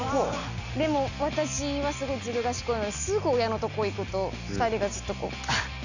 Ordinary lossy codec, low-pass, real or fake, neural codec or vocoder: none; 7.2 kHz; real; none